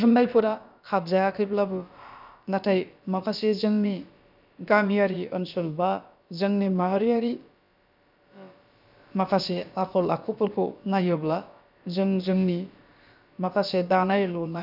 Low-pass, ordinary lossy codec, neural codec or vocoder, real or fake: 5.4 kHz; none; codec, 16 kHz, about 1 kbps, DyCAST, with the encoder's durations; fake